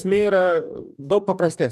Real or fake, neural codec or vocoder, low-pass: fake; codec, 44.1 kHz, 2.6 kbps, DAC; 14.4 kHz